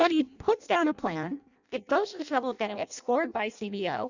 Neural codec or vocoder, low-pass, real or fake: codec, 16 kHz in and 24 kHz out, 0.6 kbps, FireRedTTS-2 codec; 7.2 kHz; fake